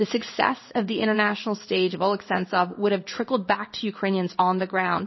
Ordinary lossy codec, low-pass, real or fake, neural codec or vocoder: MP3, 24 kbps; 7.2 kHz; fake; codec, 16 kHz in and 24 kHz out, 1 kbps, XY-Tokenizer